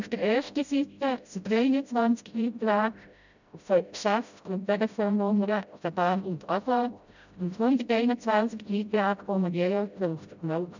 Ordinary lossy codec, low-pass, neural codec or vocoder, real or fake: none; 7.2 kHz; codec, 16 kHz, 0.5 kbps, FreqCodec, smaller model; fake